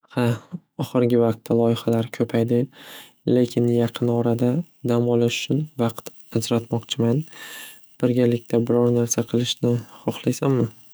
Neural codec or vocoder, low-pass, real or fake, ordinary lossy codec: autoencoder, 48 kHz, 128 numbers a frame, DAC-VAE, trained on Japanese speech; none; fake; none